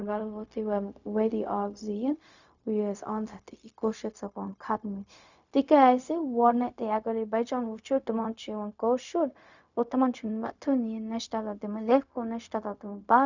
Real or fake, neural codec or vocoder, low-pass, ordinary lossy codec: fake; codec, 16 kHz, 0.4 kbps, LongCat-Audio-Codec; 7.2 kHz; none